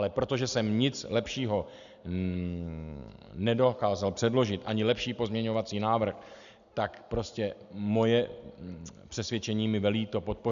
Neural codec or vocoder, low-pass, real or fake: none; 7.2 kHz; real